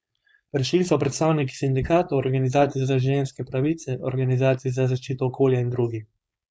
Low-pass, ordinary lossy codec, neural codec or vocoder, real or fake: none; none; codec, 16 kHz, 4.8 kbps, FACodec; fake